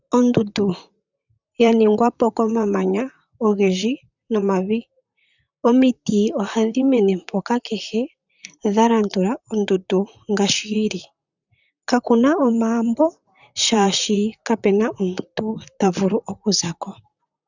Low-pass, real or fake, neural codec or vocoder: 7.2 kHz; fake; vocoder, 44.1 kHz, 128 mel bands, Pupu-Vocoder